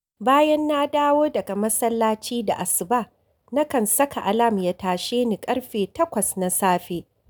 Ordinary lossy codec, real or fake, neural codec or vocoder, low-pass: none; real; none; none